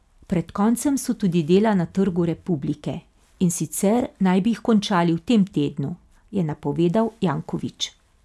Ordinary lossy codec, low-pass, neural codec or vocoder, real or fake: none; none; none; real